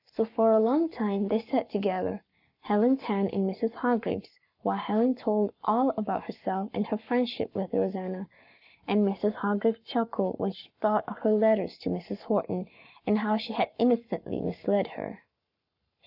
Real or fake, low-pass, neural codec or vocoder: fake; 5.4 kHz; codec, 44.1 kHz, 7.8 kbps, Pupu-Codec